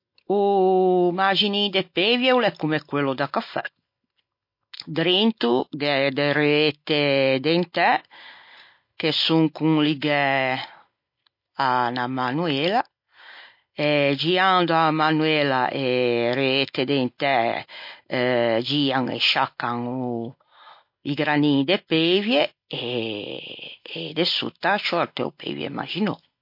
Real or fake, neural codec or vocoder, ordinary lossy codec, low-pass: real; none; MP3, 32 kbps; 5.4 kHz